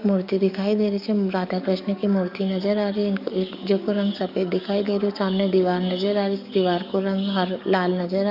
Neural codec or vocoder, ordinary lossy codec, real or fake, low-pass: codec, 44.1 kHz, 7.8 kbps, DAC; none; fake; 5.4 kHz